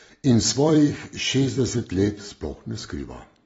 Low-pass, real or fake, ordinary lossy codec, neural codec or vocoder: 19.8 kHz; fake; AAC, 24 kbps; vocoder, 44.1 kHz, 128 mel bands every 256 samples, BigVGAN v2